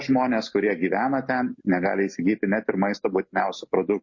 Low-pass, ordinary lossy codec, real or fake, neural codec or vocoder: 7.2 kHz; MP3, 32 kbps; real; none